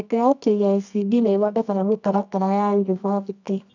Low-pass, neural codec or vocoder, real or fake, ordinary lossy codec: 7.2 kHz; codec, 24 kHz, 0.9 kbps, WavTokenizer, medium music audio release; fake; none